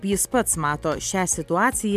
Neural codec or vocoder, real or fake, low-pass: none; real; 14.4 kHz